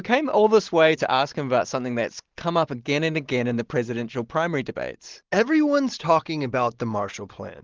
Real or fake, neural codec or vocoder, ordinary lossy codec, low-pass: real; none; Opus, 16 kbps; 7.2 kHz